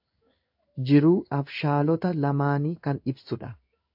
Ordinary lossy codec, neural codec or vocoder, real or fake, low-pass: AAC, 48 kbps; codec, 16 kHz in and 24 kHz out, 1 kbps, XY-Tokenizer; fake; 5.4 kHz